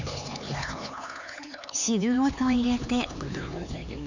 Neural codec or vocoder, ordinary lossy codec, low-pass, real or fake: codec, 16 kHz, 4 kbps, X-Codec, HuBERT features, trained on LibriSpeech; none; 7.2 kHz; fake